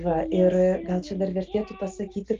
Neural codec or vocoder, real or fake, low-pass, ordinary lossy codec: none; real; 7.2 kHz; Opus, 24 kbps